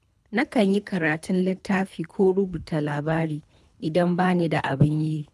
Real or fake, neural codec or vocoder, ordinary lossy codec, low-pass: fake; codec, 24 kHz, 3 kbps, HILCodec; none; none